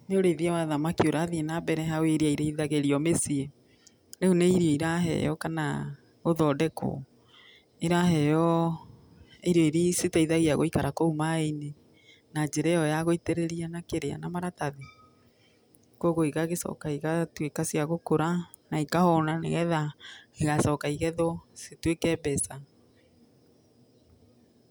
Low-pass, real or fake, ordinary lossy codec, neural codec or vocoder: none; real; none; none